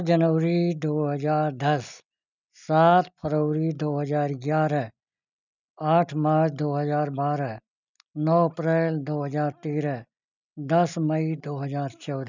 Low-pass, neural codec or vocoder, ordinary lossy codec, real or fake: 7.2 kHz; none; none; real